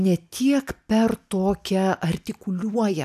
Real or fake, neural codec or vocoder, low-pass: real; none; 14.4 kHz